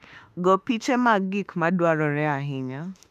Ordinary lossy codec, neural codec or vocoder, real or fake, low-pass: none; autoencoder, 48 kHz, 32 numbers a frame, DAC-VAE, trained on Japanese speech; fake; 14.4 kHz